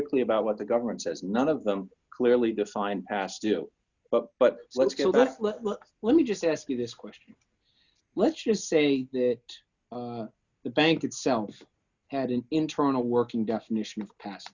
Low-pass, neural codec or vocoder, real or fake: 7.2 kHz; none; real